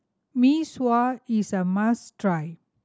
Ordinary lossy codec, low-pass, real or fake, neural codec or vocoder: none; none; real; none